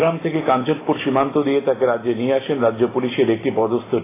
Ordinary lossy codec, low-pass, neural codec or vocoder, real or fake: AAC, 16 kbps; 3.6 kHz; none; real